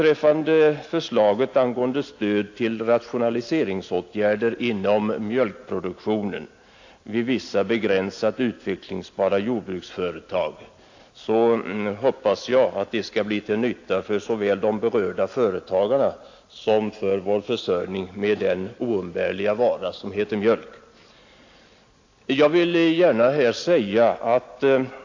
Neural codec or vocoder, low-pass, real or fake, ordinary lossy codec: none; 7.2 kHz; real; AAC, 48 kbps